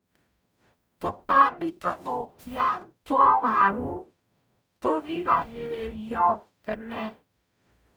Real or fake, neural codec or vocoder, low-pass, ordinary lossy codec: fake; codec, 44.1 kHz, 0.9 kbps, DAC; none; none